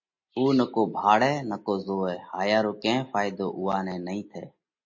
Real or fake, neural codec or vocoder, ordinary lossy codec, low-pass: real; none; MP3, 32 kbps; 7.2 kHz